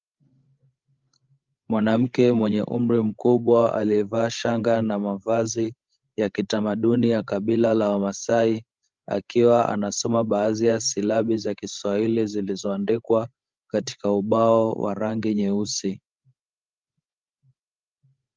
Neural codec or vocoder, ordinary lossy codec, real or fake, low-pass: codec, 16 kHz, 16 kbps, FreqCodec, larger model; Opus, 32 kbps; fake; 7.2 kHz